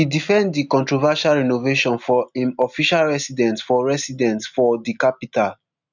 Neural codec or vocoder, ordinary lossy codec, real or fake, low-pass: none; none; real; 7.2 kHz